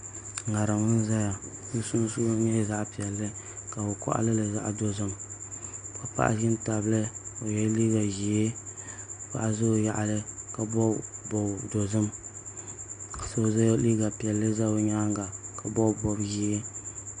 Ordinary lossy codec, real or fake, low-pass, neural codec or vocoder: MP3, 64 kbps; real; 9.9 kHz; none